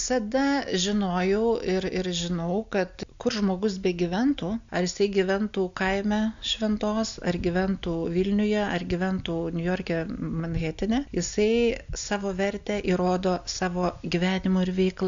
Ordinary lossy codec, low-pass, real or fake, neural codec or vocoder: MP3, 64 kbps; 7.2 kHz; real; none